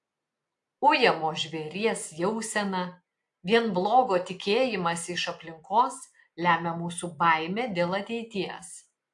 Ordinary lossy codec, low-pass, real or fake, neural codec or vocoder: AAC, 64 kbps; 10.8 kHz; real; none